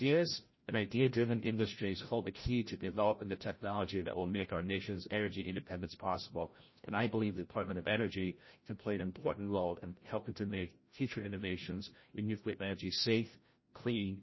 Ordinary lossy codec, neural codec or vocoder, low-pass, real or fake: MP3, 24 kbps; codec, 16 kHz, 0.5 kbps, FreqCodec, larger model; 7.2 kHz; fake